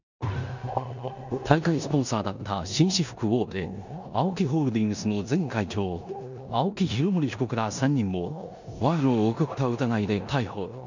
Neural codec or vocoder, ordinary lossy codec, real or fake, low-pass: codec, 16 kHz in and 24 kHz out, 0.9 kbps, LongCat-Audio-Codec, four codebook decoder; none; fake; 7.2 kHz